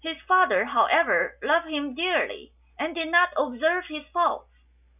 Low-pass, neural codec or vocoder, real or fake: 3.6 kHz; none; real